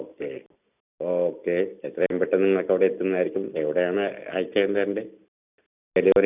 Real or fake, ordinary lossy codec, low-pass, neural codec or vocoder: real; none; 3.6 kHz; none